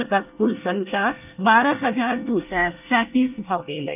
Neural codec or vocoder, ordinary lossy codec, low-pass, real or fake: codec, 24 kHz, 1 kbps, SNAC; none; 3.6 kHz; fake